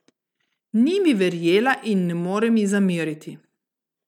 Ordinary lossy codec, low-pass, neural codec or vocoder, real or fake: none; 19.8 kHz; none; real